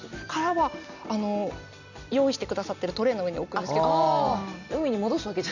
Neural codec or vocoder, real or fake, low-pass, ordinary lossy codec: none; real; 7.2 kHz; none